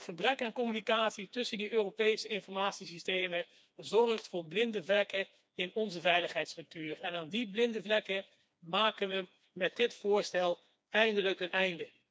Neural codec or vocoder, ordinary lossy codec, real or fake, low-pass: codec, 16 kHz, 2 kbps, FreqCodec, smaller model; none; fake; none